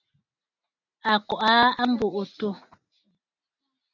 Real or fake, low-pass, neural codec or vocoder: real; 7.2 kHz; none